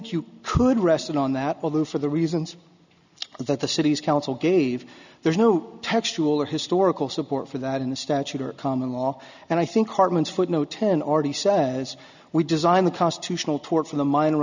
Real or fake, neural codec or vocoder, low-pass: real; none; 7.2 kHz